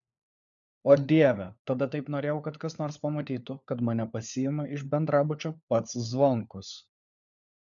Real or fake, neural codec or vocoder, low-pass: fake; codec, 16 kHz, 4 kbps, FunCodec, trained on LibriTTS, 50 frames a second; 7.2 kHz